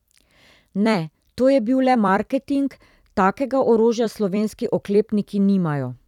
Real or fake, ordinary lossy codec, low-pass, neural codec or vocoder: fake; none; 19.8 kHz; vocoder, 44.1 kHz, 128 mel bands every 256 samples, BigVGAN v2